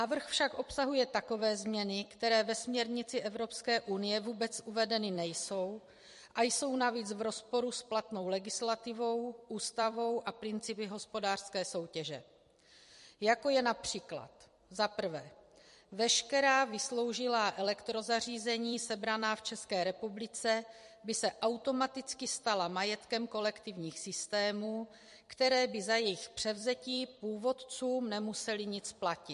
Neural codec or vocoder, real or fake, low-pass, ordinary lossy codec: vocoder, 44.1 kHz, 128 mel bands every 256 samples, BigVGAN v2; fake; 14.4 kHz; MP3, 48 kbps